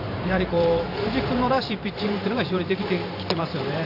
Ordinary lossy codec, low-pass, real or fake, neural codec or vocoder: none; 5.4 kHz; fake; vocoder, 44.1 kHz, 128 mel bands every 512 samples, BigVGAN v2